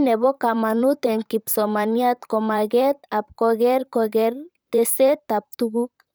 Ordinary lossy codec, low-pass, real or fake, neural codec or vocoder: none; none; fake; vocoder, 44.1 kHz, 128 mel bands, Pupu-Vocoder